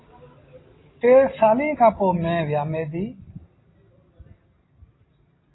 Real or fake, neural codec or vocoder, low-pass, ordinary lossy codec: real; none; 7.2 kHz; AAC, 16 kbps